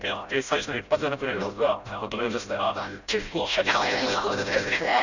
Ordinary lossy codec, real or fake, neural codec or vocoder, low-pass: none; fake; codec, 16 kHz, 0.5 kbps, FreqCodec, smaller model; 7.2 kHz